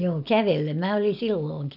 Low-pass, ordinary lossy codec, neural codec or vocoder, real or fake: 5.4 kHz; none; none; real